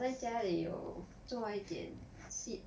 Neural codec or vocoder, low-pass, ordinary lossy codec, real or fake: none; none; none; real